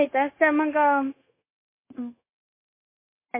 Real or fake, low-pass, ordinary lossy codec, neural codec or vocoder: fake; 3.6 kHz; MP3, 24 kbps; codec, 16 kHz, 0.9 kbps, LongCat-Audio-Codec